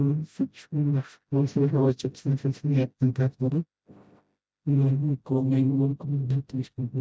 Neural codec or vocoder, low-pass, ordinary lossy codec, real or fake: codec, 16 kHz, 0.5 kbps, FreqCodec, smaller model; none; none; fake